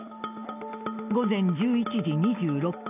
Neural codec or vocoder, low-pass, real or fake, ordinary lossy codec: none; 3.6 kHz; real; none